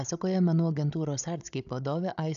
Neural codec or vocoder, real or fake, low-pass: codec, 16 kHz, 16 kbps, FunCodec, trained on Chinese and English, 50 frames a second; fake; 7.2 kHz